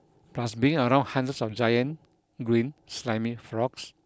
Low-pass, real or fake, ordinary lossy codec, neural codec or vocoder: none; real; none; none